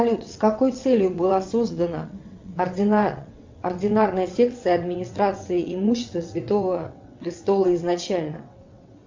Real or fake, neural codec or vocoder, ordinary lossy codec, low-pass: fake; vocoder, 22.05 kHz, 80 mel bands, WaveNeXt; MP3, 64 kbps; 7.2 kHz